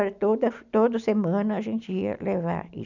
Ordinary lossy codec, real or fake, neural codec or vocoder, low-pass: none; real; none; 7.2 kHz